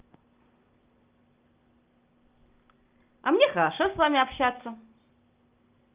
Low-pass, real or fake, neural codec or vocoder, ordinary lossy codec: 3.6 kHz; real; none; Opus, 32 kbps